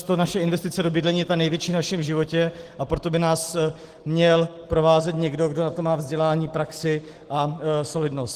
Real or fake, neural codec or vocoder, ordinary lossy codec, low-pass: real; none; Opus, 16 kbps; 14.4 kHz